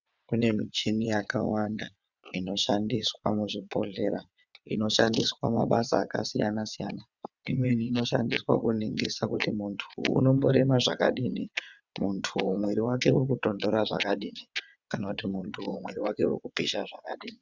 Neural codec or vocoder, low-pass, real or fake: vocoder, 22.05 kHz, 80 mel bands, WaveNeXt; 7.2 kHz; fake